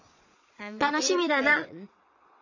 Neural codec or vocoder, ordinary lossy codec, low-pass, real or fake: vocoder, 22.05 kHz, 80 mel bands, Vocos; none; 7.2 kHz; fake